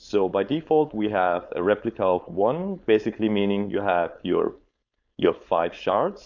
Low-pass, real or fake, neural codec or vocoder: 7.2 kHz; fake; codec, 16 kHz, 4.8 kbps, FACodec